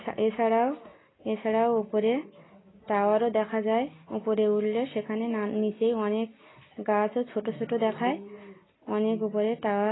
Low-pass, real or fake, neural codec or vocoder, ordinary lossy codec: 7.2 kHz; real; none; AAC, 16 kbps